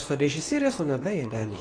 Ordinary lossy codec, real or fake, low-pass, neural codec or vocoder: AAC, 32 kbps; fake; 9.9 kHz; codec, 24 kHz, 0.9 kbps, WavTokenizer, medium speech release version 2